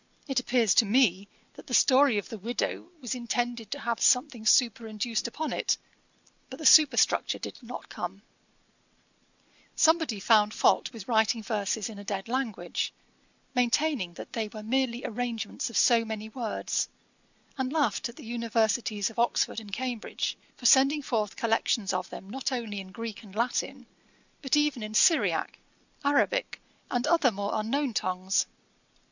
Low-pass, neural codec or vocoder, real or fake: 7.2 kHz; none; real